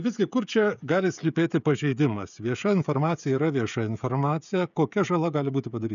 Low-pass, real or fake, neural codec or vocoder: 7.2 kHz; fake; codec, 16 kHz, 16 kbps, FreqCodec, smaller model